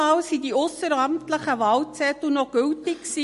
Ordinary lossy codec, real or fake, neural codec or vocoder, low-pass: MP3, 48 kbps; real; none; 14.4 kHz